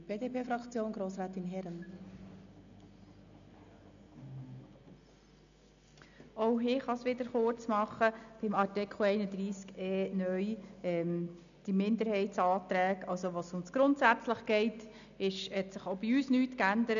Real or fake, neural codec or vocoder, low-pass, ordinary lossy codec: real; none; 7.2 kHz; none